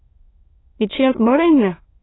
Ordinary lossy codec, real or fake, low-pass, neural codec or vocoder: AAC, 16 kbps; fake; 7.2 kHz; autoencoder, 22.05 kHz, a latent of 192 numbers a frame, VITS, trained on many speakers